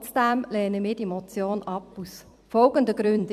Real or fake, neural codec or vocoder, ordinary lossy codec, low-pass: fake; vocoder, 44.1 kHz, 128 mel bands every 256 samples, BigVGAN v2; AAC, 96 kbps; 14.4 kHz